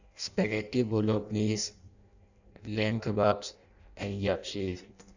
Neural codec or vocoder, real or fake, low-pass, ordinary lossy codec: codec, 16 kHz in and 24 kHz out, 0.6 kbps, FireRedTTS-2 codec; fake; 7.2 kHz; none